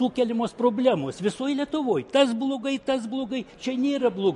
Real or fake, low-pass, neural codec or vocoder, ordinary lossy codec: real; 14.4 kHz; none; MP3, 48 kbps